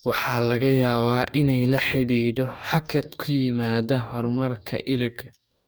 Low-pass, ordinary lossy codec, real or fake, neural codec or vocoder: none; none; fake; codec, 44.1 kHz, 2.6 kbps, DAC